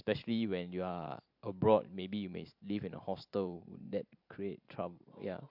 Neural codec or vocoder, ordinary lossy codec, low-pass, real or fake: vocoder, 44.1 kHz, 128 mel bands every 512 samples, BigVGAN v2; MP3, 48 kbps; 5.4 kHz; fake